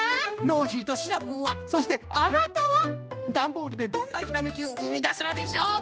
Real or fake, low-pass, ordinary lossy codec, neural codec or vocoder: fake; none; none; codec, 16 kHz, 1 kbps, X-Codec, HuBERT features, trained on general audio